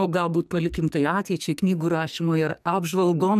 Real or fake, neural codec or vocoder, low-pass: fake; codec, 44.1 kHz, 2.6 kbps, SNAC; 14.4 kHz